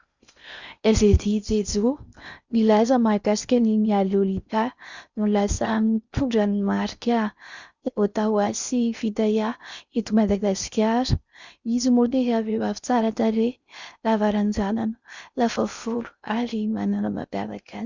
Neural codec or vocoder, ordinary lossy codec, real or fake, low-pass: codec, 16 kHz in and 24 kHz out, 0.6 kbps, FocalCodec, streaming, 4096 codes; Opus, 64 kbps; fake; 7.2 kHz